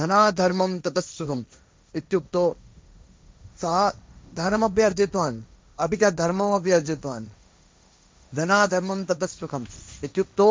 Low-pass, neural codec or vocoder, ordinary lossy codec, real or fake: none; codec, 16 kHz, 1.1 kbps, Voila-Tokenizer; none; fake